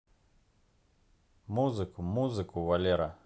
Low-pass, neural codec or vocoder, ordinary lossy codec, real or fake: none; none; none; real